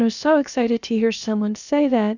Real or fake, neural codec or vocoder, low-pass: fake; codec, 16 kHz, about 1 kbps, DyCAST, with the encoder's durations; 7.2 kHz